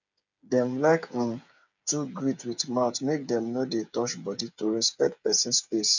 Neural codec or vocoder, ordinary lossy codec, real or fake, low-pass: codec, 16 kHz, 8 kbps, FreqCodec, smaller model; none; fake; 7.2 kHz